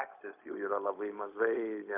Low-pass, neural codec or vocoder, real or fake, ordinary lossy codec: 3.6 kHz; codec, 16 kHz, 0.4 kbps, LongCat-Audio-Codec; fake; AAC, 32 kbps